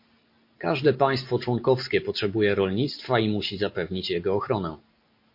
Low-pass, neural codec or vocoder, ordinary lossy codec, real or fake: 5.4 kHz; none; MP3, 32 kbps; real